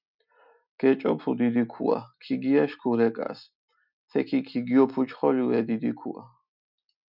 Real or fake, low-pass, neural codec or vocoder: real; 5.4 kHz; none